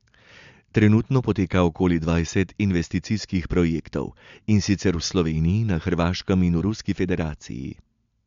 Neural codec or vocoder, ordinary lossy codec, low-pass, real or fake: none; MP3, 64 kbps; 7.2 kHz; real